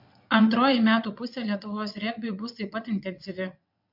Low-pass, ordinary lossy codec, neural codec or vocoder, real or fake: 5.4 kHz; MP3, 48 kbps; vocoder, 22.05 kHz, 80 mel bands, WaveNeXt; fake